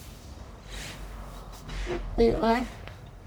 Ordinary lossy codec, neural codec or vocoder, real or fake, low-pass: none; codec, 44.1 kHz, 1.7 kbps, Pupu-Codec; fake; none